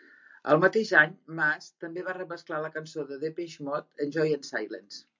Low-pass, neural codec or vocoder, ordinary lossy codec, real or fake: 7.2 kHz; none; MP3, 64 kbps; real